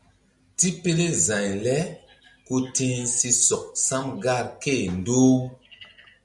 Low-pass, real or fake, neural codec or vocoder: 10.8 kHz; real; none